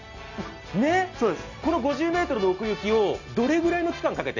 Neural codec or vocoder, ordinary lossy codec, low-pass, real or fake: none; none; 7.2 kHz; real